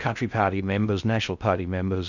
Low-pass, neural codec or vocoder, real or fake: 7.2 kHz; codec, 16 kHz in and 24 kHz out, 0.6 kbps, FocalCodec, streaming, 4096 codes; fake